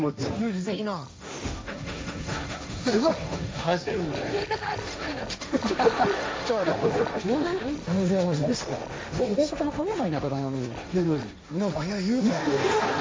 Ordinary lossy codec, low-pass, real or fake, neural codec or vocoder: none; none; fake; codec, 16 kHz, 1.1 kbps, Voila-Tokenizer